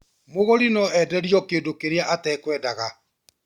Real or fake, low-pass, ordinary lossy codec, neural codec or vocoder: real; 19.8 kHz; Opus, 64 kbps; none